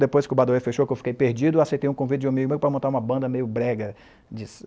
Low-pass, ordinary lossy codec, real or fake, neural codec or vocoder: none; none; real; none